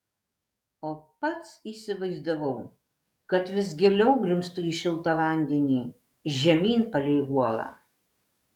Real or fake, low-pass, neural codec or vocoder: fake; 19.8 kHz; codec, 44.1 kHz, 7.8 kbps, DAC